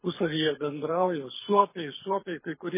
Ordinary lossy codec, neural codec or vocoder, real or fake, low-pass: MP3, 16 kbps; codec, 24 kHz, 6 kbps, HILCodec; fake; 3.6 kHz